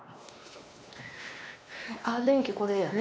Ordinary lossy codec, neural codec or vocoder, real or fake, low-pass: none; codec, 16 kHz, 2 kbps, X-Codec, WavLM features, trained on Multilingual LibriSpeech; fake; none